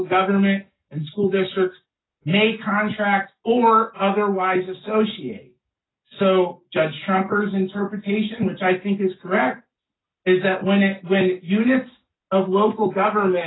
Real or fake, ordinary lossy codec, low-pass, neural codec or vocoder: real; AAC, 16 kbps; 7.2 kHz; none